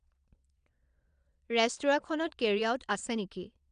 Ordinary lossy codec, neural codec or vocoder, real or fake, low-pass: none; vocoder, 22.05 kHz, 80 mel bands, Vocos; fake; 9.9 kHz